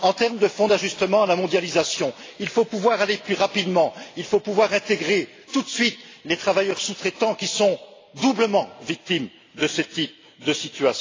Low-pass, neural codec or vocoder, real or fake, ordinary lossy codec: 7.2 kHz; none; real; AAC, 32 kbps